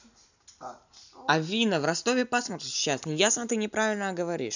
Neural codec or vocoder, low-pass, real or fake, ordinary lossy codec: none; 7.2 kHz; real; none